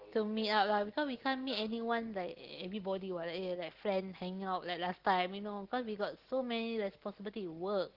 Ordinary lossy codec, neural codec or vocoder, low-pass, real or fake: Opus, 16 kbps; none; 5.4 kHz; real